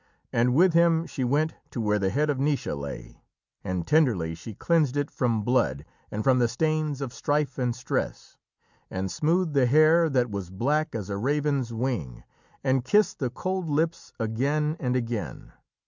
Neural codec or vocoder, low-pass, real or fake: none; 7.2 kHz; real